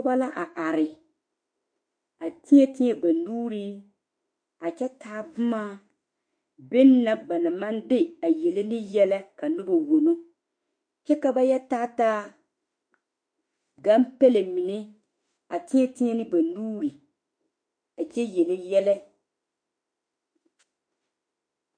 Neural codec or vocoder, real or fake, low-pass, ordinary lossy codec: autoencoder, 48 kHz, 32 numbers a frame, DAC-VAE, trained on Japanese speech; fake; 9.9 kHz; MP3, 48 kbps